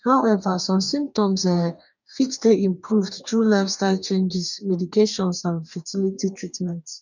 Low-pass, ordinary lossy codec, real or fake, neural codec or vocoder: 7.2 kHz; none; fake; codec, 44.1 kHz, 2.6 kbps, DAC